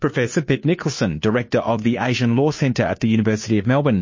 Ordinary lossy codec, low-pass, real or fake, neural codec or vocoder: MP3, 32 kbps; 7.2 kHz; fake; codec, 16 kHz, 2 kbps, FunCodec, trained on Chinese and English, 25 frames a second